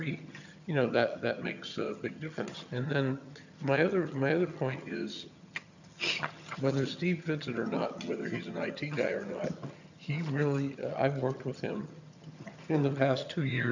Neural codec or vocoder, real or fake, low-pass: vocoder, 22.05 kHz, 80 mel bands, HiFi-GAN; fake; 7.2 kHz